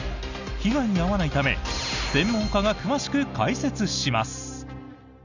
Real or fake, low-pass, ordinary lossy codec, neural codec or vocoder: real; 7.2 kHz; none; none